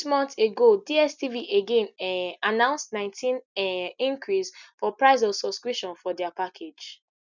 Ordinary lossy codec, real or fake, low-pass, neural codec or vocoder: none; real; 7.2 kHz; none